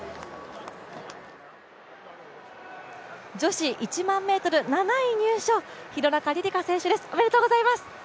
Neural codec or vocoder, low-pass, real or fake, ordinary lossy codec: none; none; real; none